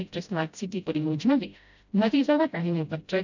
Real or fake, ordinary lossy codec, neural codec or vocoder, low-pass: fake; none; codec, 16 kHz, 0.5 kbps, FreqCodec, smaller model; 7.2 kHz